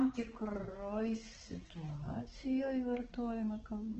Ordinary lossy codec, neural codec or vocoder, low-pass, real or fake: Opus, 16 kbps; codec, 16 kHz, 4 kbps, X-Codec, HuBERT features, trained on balanced general audio; 7.2 kHz; fake